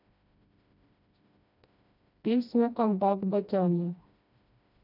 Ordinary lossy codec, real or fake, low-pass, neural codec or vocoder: none; fake; 5.4 kHz; codec, 16 kHz, 1 kbps, FreqCodec, smaller model